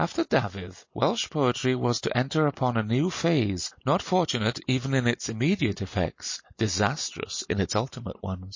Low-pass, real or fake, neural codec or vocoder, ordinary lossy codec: 7.2 kHz; real; none; MP3, 32 kbps